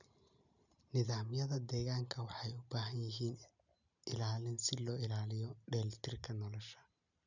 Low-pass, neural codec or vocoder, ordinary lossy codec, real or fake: 7.2 kHz; none; none; real